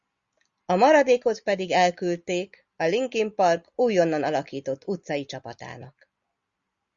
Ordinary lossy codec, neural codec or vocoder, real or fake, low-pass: Opus, 64 kbps; none; real; 7.2 kHz